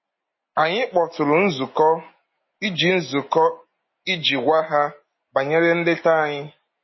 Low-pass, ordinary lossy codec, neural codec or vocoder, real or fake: 7.2 kHz; MP3, 24 kbps; none; real